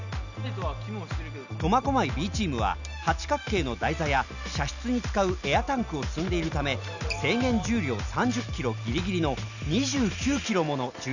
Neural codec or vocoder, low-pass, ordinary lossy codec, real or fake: none; 7.2 kHz; none; real